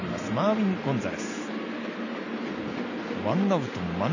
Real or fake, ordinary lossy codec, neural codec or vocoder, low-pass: real; none; none; 7.2 kHz